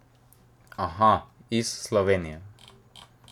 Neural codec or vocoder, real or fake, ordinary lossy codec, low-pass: none; real; none; 19.8 kHz